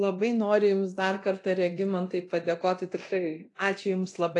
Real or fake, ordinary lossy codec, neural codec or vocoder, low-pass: fake; AAC, 48 kbps; codec, 24 kHz, 0.9 kbps, DualCodec; 10.8 kHz